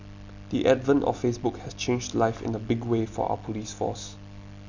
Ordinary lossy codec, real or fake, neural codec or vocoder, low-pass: Opus, 64 kbps; real; none; 7.2 kHz